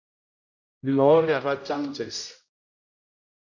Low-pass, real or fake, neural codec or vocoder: 7.2 kHz; fake; codec, 16 kHz, 0.5 kbps, X-Codec, HuBERT features, trained on general audio